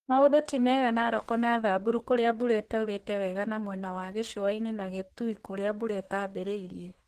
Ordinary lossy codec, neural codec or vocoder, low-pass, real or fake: Opus, 16 kbps; codec, 32 kHz, 1.9 kbps, SNAC; 14.4 kHz; fake